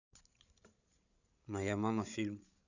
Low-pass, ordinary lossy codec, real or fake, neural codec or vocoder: 7.2 kHz; none; fake; codec, 16 kHz, 16 kbps, FreqCodec, larger model